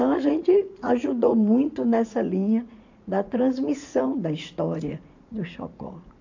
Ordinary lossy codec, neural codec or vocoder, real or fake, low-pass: AAC, 48 kbps; vocoder, 44.1 kHz, 80 mel bands, Vocos; fake; 7.2 kHz